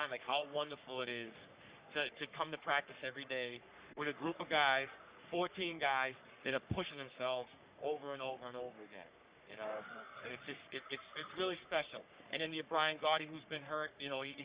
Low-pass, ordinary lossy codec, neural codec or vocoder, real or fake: 3.6 kHz; Opus, 64 kbps; codec, 44.1 kHz, 3.4 kbps, Pupu-Codec; fake